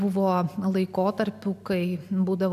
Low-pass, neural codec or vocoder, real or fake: 14.4 kHz; none; real